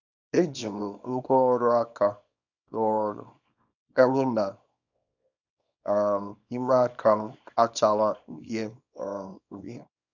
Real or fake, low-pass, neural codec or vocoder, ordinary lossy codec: fake; 7.2 kHz; codec, 24 kHz, 0.9 kbps, WavTokenizer, small release; none